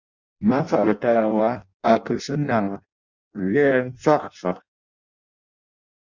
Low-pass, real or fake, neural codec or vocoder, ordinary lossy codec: 7.2 kHz; fake; codec, 16 kHz in and 24 kHz out, 0.6 kbps, FireRedTTS-2 codec; Opus, 64 kbps